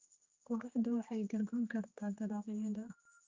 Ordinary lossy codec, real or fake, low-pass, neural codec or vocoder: Opus, 24 kbps; fake; 7.2 kHz; codec, 16 kHz, 2 kbps, X-Codec, HuBERT features, trained on general audio